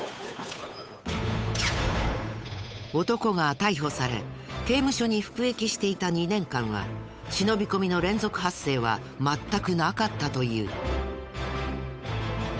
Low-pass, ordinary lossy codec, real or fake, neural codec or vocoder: none; none; fake; codec, 16 kHz, 8 kbps, FunCodec, trained on Chinese and English, 25 frames a second